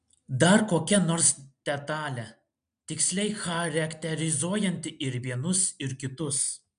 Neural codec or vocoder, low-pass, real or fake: none; 9.9 kHz; real